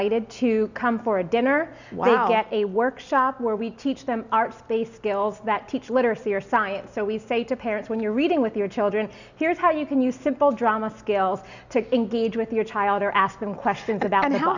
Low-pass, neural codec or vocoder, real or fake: 7.2 kHz; none; real